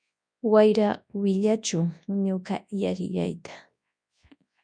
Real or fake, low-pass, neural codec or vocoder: fake; 9.9 kHz; codec, 24 kHz, 0.9 kbps, WavTokenizer, large speech release